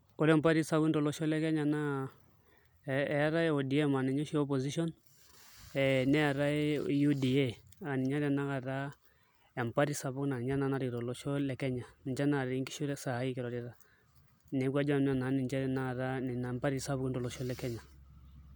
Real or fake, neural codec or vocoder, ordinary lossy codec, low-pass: real; none; none; none